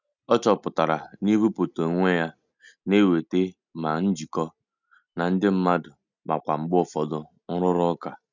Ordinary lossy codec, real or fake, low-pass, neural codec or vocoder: none; real; 7.2 kHz; none